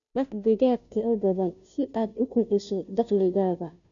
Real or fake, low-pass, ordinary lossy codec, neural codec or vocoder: fake; 7.2 kHz; none; codec, 16 kHz, 0.5 kbps, FunCodec, trained on Chinese and English, 25 frames a second